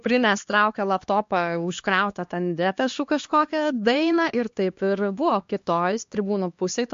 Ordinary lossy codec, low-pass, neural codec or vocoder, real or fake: MP3, 48 kbps; 7.2 kHz; codec, 16 kHz, 2 kbps, X-Codec, HuBERT features, trained on LibriSpeech; fake